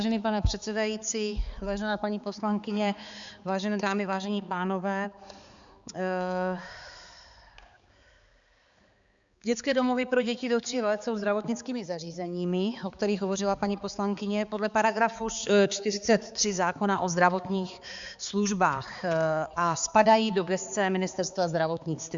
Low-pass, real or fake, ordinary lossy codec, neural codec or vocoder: 7.2 kHz; fake; Opus, 64 kbps; codec, 16 kHz, 4 kbps, X-Codec, HuBERT features, trained on balanced general audio